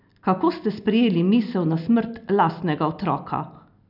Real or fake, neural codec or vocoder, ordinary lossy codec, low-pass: real; none; none; 5.4 kHz